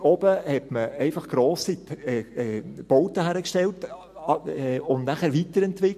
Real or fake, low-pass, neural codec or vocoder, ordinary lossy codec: real; 14.4 kHz; none; MP3, 64 kbps